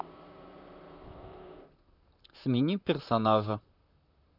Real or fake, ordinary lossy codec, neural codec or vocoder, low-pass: fake; none; vocoder, 44.1 kHz, 128 mel bands, Pupu-Vocoder; 5.4 kHz